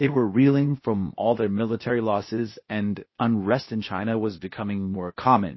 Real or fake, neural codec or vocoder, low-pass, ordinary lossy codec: fake; codec, 16 kHz, 0.8 kbps, ZipCodec; 7.2 kHz; MP3, 24 kbps